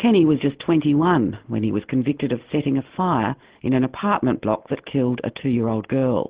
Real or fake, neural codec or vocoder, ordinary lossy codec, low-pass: real; none; Opus, 16 kbps; 3.6 kHz